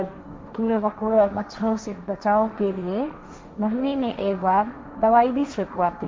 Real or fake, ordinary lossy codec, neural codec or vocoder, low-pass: fake; none; codec, 16 kHz, 1.1 kbps, Voila-Tokenizer; none